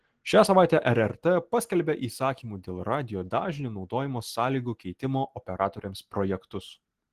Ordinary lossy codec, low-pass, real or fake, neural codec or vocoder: Opus, 16 kbps; 14.4 kHz; real; none